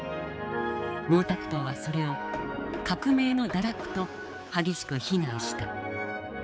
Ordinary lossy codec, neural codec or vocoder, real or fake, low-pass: none; codec, 16 kHz, 4 kbps, X-Codec, HuBERT features, trained on balanced general audio; fake; none